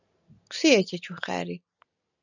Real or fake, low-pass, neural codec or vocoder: real; 7.2 kHz; none